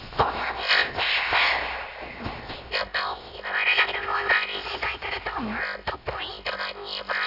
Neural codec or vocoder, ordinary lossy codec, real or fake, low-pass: codec, 16 kHz, 0.7 kbps, FocalCodec; none; fake; 5.4 kHz